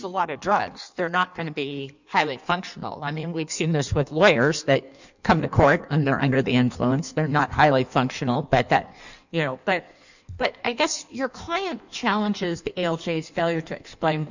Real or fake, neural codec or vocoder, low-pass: fake; codec, 16 kHz in and 24 kHz out, 1.1 kbps, FireRedTTS-2 codec; 7.2 kHz